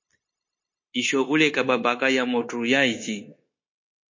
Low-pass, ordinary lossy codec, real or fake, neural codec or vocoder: 7.2 kHz; MP3, 32 kbps; fake; codec, 16 kHz, 0.9 kbps, LongCat-Audio-Codec